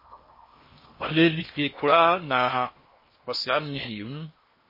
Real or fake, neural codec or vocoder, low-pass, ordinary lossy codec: fake; codec, 16 kHz in and 24 kHz out, 0.8 kbps, FocalCodec, streaming, 65536 codes; 5.4 kHz; MP3, 24 kbps